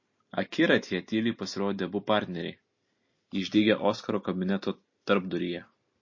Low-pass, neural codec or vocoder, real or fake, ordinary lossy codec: 7.2 kHz; none; real; MP3, 32 kbps